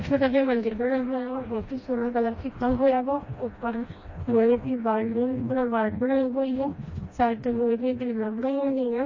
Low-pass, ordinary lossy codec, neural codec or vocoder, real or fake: 7.2 kHz; MP3, 32 kbps; codec, 16 kHz, 1 kbps, FreqCodec, smaller model; fake